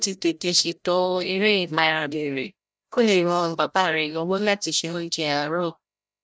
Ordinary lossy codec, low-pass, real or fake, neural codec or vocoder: none; none; fake; codec, 16 kHz, 0.5 kbps, FreqCodec, larger model